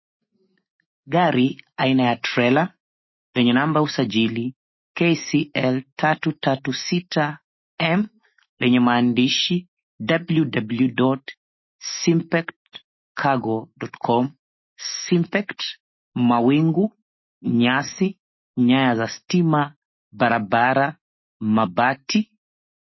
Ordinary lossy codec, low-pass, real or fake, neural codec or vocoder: MP3, 24 kbps; 7.2 kHz; fake; autoencoder, 48 kHz, 128 numbers a frame, DAC-VAE, trained on Japanese speech